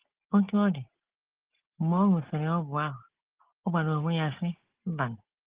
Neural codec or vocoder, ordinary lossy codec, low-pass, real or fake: none; Opus, 16 kbps; 3.6 kHz; real